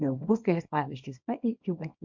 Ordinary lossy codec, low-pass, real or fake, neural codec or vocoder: MP3, 48 kbps; 7.2 kHz; fake; codec, 24 kHz, 0.9 kbps, WavTokenizer, small release